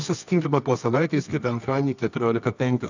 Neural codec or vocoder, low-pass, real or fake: codec, 24 kHz, 0.9 kbps, WavTokenizer, medium music audio release; 7.2 kHz; fake